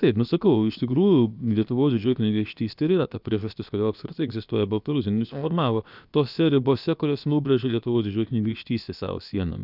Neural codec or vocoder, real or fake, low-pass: codec, 16 kHz, about 1 kbps, DyCAST, with the encoder's durations; fake; 5.4 kHz